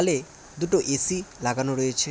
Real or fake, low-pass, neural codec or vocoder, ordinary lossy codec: real; none; none; none